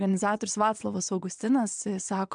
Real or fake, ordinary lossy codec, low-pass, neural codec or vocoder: fake; MP3, 96 kbps; 9.9 kHz; vocoder, 22.05 kHz, 80 mel bands, WaveNeXt